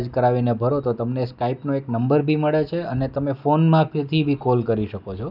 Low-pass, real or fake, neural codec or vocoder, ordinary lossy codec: 5.4 kHz; real; none; none